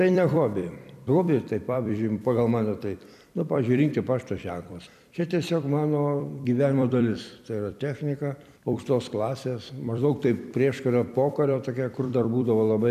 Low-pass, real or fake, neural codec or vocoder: 14.4 kHz; fake; vocoder, 44.1 kHz, 128 mel bands every 256 samples, BigVGAN v2